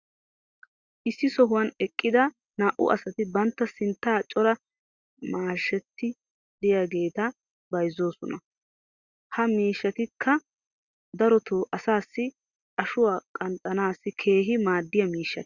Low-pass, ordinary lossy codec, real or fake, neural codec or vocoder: 7.2 kHz; Opus, 64 kbps; real; none